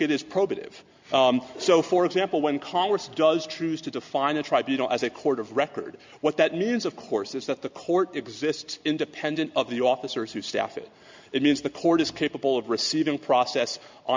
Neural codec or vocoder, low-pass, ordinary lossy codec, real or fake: none; 7.2 kHz; MP3, 64 kbps; real